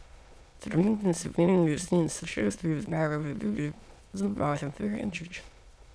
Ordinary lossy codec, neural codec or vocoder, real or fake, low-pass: none; autoencoder, 22.05 kHz, a latent of 192 numbers a frame, VITS, trained on many speakers; fake; none